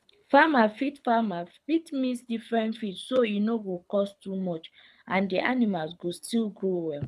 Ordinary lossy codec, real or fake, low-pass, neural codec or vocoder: none; fake; none; codec, 24 kHz, 6 kbps, HILCodec